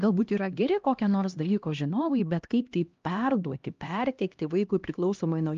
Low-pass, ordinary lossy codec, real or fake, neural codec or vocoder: 7.2 kHz; Opus, 32 kbps; fake; codec, 16 kHz, 1 kbps, X-Codec, HuBERT features, trained on LibriSpeech